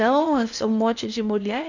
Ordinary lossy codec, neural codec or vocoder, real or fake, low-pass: none; codec, 16 kHz in and 24 kHz out, 0.8 kbps, FocalCodec, streaming, 65536 codes; fake; 7.2 kHz